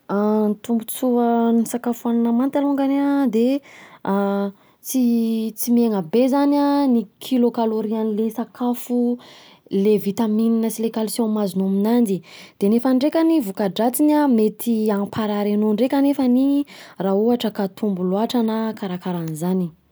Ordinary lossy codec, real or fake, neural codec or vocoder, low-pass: none; real; none; none